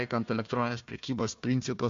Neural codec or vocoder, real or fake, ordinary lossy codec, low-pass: codec, 16 kHz, 1 kbps, FunCodec, trained on Chinese and English, 50 frames a second; fake; MP3, 48 kbps; 7.2 kHz